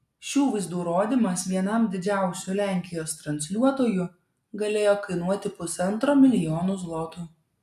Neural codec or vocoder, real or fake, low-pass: none; real; 14.4 kHz